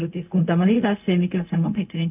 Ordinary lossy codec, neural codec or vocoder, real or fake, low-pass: none; codec, 16 kHz, 0.4 kbps, LongCat-Audio-Codec; fake; 3.6 kHz